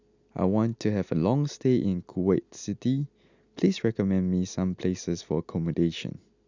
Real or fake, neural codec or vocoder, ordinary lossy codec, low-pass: real; none; none; 7.2 kHz